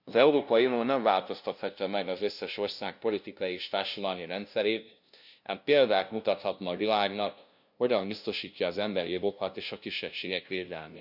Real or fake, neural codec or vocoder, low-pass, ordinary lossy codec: fake; codec, 16 kHz, 0.5 kbps, FunCodec, trained on LibriTTS, 25 frames a second; 5.4 kHz; none